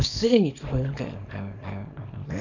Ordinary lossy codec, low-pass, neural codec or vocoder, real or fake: none; 7.2 kHz; codec, 24 kHz, 0.9 kbps, WavTokenizer, small release; fake